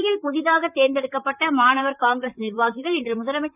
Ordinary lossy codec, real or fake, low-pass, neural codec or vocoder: none; fake; 3.6 kHz; vocoder, 44.1 kHz, 128 mel bands, Pupu-Vocoder